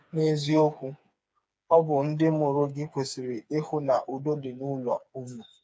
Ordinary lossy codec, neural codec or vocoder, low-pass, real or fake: none; codec, 16 kHz, 4 kbps, FreqCodec, smaller model; none; fake